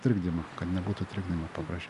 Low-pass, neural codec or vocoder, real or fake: 10.8 kHz; none; real